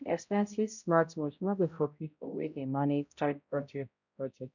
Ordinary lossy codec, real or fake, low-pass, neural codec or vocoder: none; fake; 7.2 kHz; codec, 16 kHz, 0.5 kbps, X-Codec, HuBERT features, trained on balanced general audio